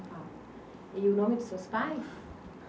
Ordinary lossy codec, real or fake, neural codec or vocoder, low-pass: none; real; none; none